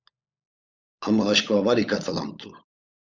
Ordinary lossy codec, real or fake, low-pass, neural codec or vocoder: Opus, 64 kbps; fake; 7.2 kHz; codec, 16 kHz, 16 kbps, FunCodec, trained on LibriTTS, 50 frames a second